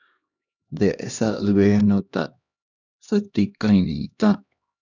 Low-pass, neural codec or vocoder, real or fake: 7.2 kHz; codec, 16 kHz, 1 kbps, X-Codec, HuBERT features, trained on LibriSpeech; fake